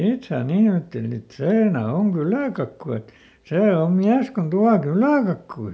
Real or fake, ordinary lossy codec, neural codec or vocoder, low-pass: real; none; none; none